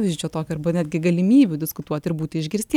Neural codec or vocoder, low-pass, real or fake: none; 19.8 kHz; real